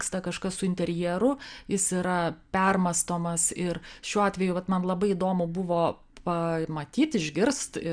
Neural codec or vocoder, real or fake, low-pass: none; real; 9.9 kHz